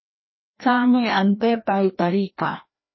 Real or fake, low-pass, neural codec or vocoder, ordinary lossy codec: fake; 7.2 kHz; codec, 16 kHz, 1 kbps, FreqCodec, larger model; MP3, 24 kbps